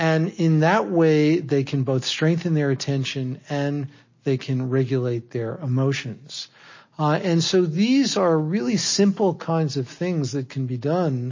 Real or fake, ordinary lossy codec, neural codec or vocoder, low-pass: real; MP3, 32 kbps; none; 7.2 kHz